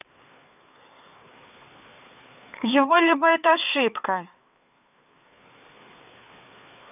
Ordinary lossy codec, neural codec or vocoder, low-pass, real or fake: none; codec, 16 kHz, 4 kbps, FreqCodec, larger model; 3.6 kHz; fake